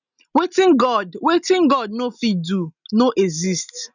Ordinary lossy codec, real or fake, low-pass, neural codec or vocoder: none; real; 7.2 kHz; none